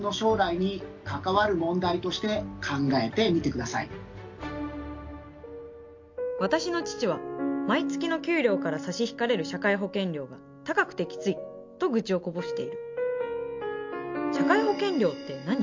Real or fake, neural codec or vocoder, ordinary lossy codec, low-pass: real; none; MP3, 48 kbps; 7.2 kHz